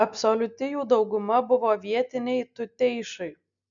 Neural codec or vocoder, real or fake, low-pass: none; real; 7.2 kHz